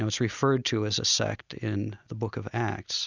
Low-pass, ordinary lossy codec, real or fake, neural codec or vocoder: 7.2 kHz; Opus, 64 kbps; real; none